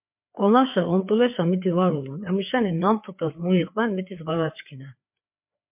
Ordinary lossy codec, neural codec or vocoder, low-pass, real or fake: MP3, 32 kbps; codec, 16 kHz, 4 kbps, FreqCodec, larger model; 3.6 kHz; fake